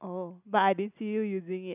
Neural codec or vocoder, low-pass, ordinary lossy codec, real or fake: none; 3.6 kHz; none; real